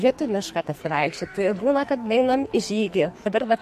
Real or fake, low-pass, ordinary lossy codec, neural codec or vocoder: fake; 14.4 kHz; MP3, 64 kbps; codec, 44.1 kHz, 2.6 kbps, SNAC